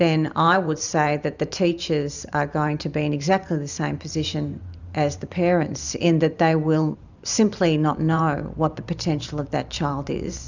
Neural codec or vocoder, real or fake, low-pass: vocoder, 44.1 kHz, 128 mel bands every 512 samples, BigVGAN v2; fake; 7.2 kHz